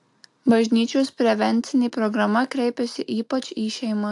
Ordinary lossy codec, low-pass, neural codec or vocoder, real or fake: AAC, 64 kbps; 10.8 kHz; none; real